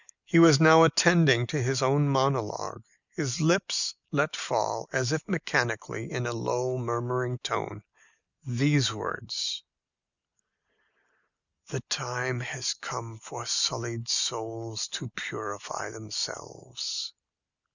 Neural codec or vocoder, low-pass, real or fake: none; 7.2 kHz; real